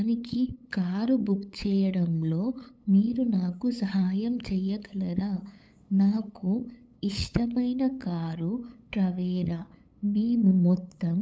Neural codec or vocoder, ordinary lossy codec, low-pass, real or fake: codec, 16 kHz, 8 kbps, FunCodec, trained on LibriTTS, 25 frames a second; none; none; fake